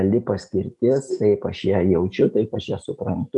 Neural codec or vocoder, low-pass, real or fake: none; 10.8 kHz; real